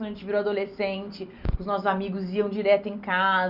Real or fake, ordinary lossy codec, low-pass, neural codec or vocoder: real; none; 5.4 kHz; none